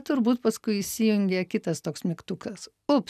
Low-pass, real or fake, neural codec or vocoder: 14.4 kHz; real; none